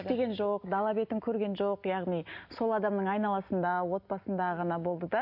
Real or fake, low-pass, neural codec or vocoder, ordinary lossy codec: real; 5.4 kHz; none; none